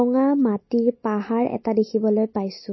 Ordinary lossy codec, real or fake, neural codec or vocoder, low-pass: MP3, 24 kbps; real; none; 7.2 kHz